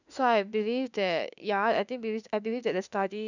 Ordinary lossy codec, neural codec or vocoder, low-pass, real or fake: none; autoencoder, 48 kHz, 32 numbers a frame, DAC-VAE, trained on Japanese speech; 7.2 kHz; fake